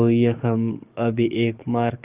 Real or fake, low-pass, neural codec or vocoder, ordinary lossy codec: fake; 3.6 kHz; codec, 44.1 kHz, 7.8 kbps, DAC; Opus, 32 kbps